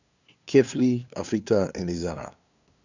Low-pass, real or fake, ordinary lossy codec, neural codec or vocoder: 7.2 kHz; fake; none; codec, 16 kHz, 2 kbps, FunCodec, trained on LibriTTS, 25 frames a second